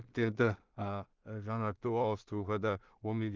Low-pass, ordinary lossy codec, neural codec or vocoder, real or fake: 7.2 kHz; Opus, 24 kbps; codec, 16 kHz in and 24 kHz out, 0.4 kbps, LongCat-Audio-Codec, two codebook decoder; fake